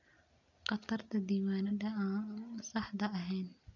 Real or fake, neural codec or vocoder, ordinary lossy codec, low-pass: real; none; none; 7.2 kHz